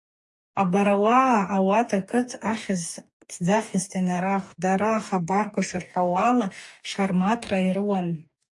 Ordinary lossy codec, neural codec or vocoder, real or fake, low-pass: AAC, 64 kbps; codec, 44.1 kHz, 2.6 kbps, DAC; fake; 10.8 kHz